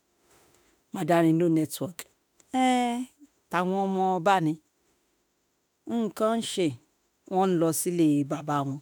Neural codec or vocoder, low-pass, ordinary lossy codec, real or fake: autoencoder, 48 kHz, 32 numbers a frame, DAC-VAE, trained on Japanese speech; none; none; fake